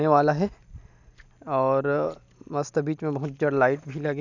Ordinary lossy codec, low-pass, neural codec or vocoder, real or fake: none; 7.2 kHz; none; real